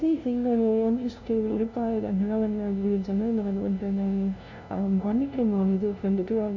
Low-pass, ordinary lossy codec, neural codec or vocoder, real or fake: 7.2 kHz; none; codec, 16 kHz, 0.5 kbps, FunCodec, trained on LibriTTS, 25 frames a second; fake